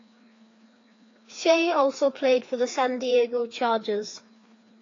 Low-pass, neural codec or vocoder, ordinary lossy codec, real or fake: 7.2 kHz; codec, 16 kHz, 2 kbps, FreqCodec, larger model; AAC, 32 kbps; fake